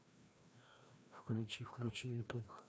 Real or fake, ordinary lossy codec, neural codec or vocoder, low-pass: fake; none; codec, 16 kHz, 1 kbps, FreqCodec, larger model; none